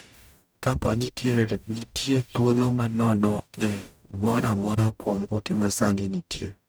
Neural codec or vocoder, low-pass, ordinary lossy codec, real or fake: codec, 44.1 kHz, 0.9 kbps, DAC; none; none; fake